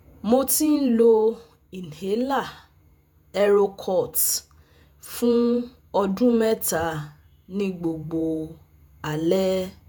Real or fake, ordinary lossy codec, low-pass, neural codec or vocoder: fake; none; none; vocoder, 48 kHz, 128 mel bands, Vocos